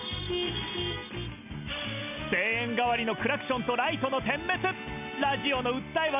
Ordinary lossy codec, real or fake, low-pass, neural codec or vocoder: none; real; 3.6 kHz; none